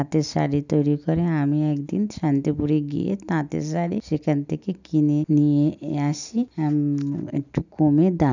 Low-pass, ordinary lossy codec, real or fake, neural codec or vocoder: 7.2 kHz; none; real; none